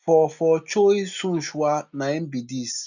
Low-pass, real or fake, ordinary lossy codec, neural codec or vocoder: 7.2 kHz; real; none; none